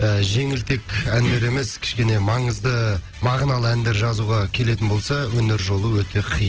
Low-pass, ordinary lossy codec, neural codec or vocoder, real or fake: 7.2 kHz; Opus, 16 kbps; none; real